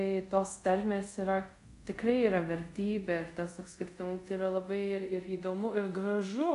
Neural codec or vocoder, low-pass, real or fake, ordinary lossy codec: codec, 24 kHz, 0.5 kbps, DualCodec; 10.8 kHz; fake; AAC, 64 kbps